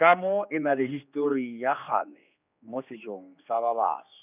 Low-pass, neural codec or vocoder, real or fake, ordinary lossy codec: 3.6 kHz; codec, 16 kHz, 2 kbps, X-Codec, HuBERT features, trained on general audio; fake; none